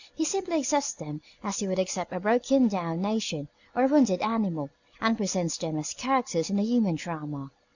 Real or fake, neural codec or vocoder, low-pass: real; none; 7.2 kHz